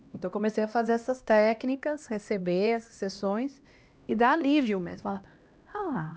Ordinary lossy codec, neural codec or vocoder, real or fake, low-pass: none; codec, 16 kHz, 1 kbps, X-Codec, HuBERT features, trained on LibriSpeech; fake; none